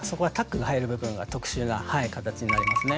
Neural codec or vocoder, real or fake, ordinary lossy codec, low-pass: none; real; none; none